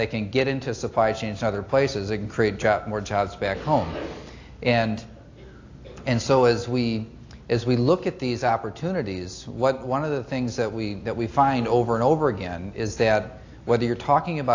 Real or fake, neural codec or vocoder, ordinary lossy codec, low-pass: real; none; AAC, 48 kbps; 7.2 kHz